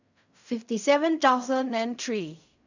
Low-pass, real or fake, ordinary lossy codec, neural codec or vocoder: 7.2 kHz; fake; none; codec, 16 kHz in and 24 kHz out, 0.4 kbps, LongCat-Audio-Codec, fine tuned four codebook decoder